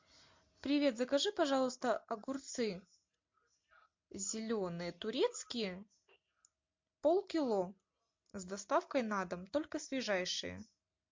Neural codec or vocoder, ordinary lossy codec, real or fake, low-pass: none; MP3, 48 kbps; real; 7.2 kHz